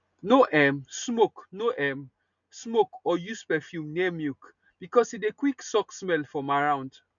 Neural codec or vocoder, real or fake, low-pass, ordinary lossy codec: none; real; 7.2 kHz; none